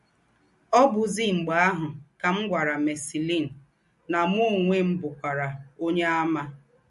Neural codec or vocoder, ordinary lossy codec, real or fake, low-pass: none; MP3, 48 kbps; real; 14.4 kHz